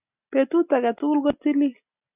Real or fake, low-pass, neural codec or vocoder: real; 3.6 kHz; none